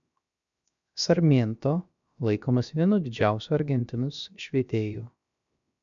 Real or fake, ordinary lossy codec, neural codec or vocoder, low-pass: fake; MP3, 64 kbps; codec, 16 kHz, 0.7 kbps, FocalCodec; 7.2 kHz